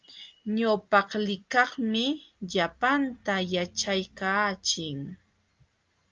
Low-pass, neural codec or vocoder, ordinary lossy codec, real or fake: 7.2 kHz; none; Opus, 32 kbps; real